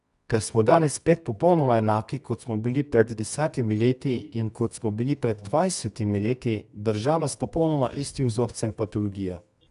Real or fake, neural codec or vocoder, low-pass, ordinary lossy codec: fake; codec, 24 kHz, 0.9 kbps, WavTokenizer, medium music audio release; 10.8 kHz; none